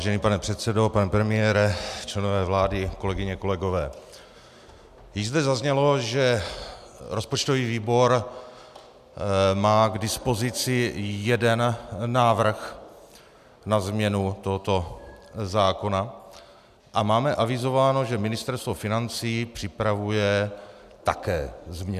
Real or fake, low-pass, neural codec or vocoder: real; 14.4 kHz; none